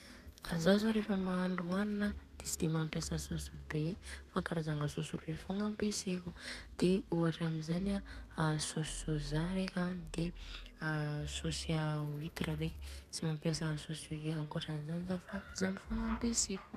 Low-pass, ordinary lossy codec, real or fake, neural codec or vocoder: 14.4 kHz; none; fake; codec, 32 kHz, 1.9 kbps, SNAC